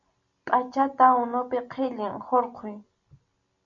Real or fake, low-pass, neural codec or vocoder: real; 7.2 kHz; none